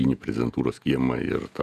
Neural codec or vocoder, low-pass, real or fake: vocoder, 48 kHz, 128 mel bands, Vocos; 14.4 kHz; fake